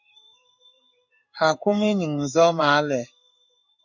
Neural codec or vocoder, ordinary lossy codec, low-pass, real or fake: codec, 16 kHz in and 24 kHz out, 1 kbps, XY-Tokenizer; MP3, 64 kbps; 7.2 kHz; fake